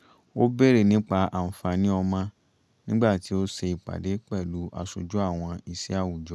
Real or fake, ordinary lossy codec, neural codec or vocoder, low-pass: real; none; none; none